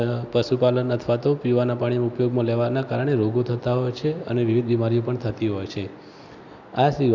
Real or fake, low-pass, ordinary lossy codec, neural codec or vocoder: real; 7.2 kHz; none; none